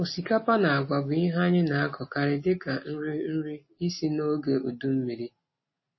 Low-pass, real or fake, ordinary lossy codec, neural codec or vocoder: 7.2 kHz; real; MP3, 24 kbps; none